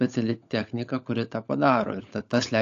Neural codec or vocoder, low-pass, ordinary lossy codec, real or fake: codec, 16 kHz, 16 kbps, FunCodec, trained on LibriTTS, 50 frames a second; 7.2 kHz; AAC, 64 kbps; fake